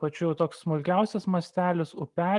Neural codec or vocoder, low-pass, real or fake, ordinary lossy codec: none; 10.8 kHz; real; Opus, 32 kbps